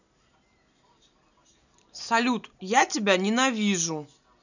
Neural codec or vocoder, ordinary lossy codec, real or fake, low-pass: none; none; real; 7.2 kHz